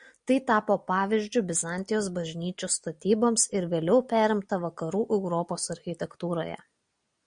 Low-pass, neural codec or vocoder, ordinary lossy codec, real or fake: 9.9 kHz; none; MP3, 96 kbps; real